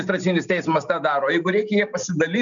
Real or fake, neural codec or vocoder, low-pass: real; none; 7.2 kHz